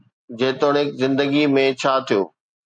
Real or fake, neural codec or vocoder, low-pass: real; none; 9.9 kHz